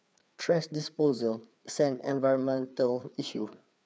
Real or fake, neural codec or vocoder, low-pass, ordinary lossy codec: fake; codec, 16 kHz, 4 kbps, FreqCodec, larger model; none; none